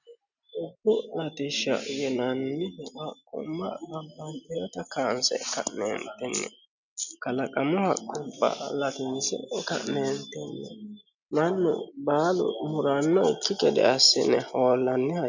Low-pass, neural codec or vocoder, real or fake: 7.2 kHz; none; real